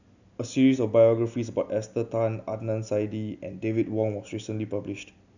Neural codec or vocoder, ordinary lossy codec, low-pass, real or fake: none; none; 7.2 kHz; real